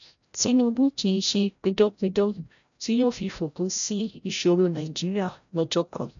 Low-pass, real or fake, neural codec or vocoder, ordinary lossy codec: 7.2 kHz; fake; codec, 16 kHz, 0.5 kbps, FreqCodec, larger model; none